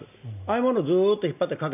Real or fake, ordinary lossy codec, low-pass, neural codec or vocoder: real; none; 3.6 kHz; none